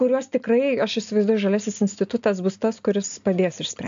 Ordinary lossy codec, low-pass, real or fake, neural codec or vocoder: AAC, 64 kbps; 7.2 kHz; real; none